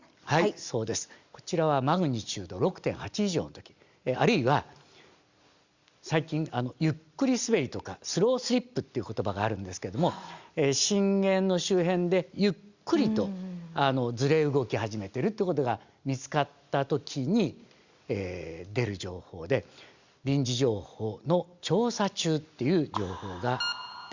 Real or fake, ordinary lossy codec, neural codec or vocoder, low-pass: real; Opus, 64 kbps; none; 7.2 kHz